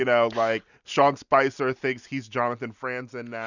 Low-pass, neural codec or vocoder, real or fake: 7.2 kHz; none; real